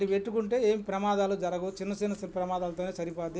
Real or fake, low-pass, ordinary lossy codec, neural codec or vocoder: real; none; none; none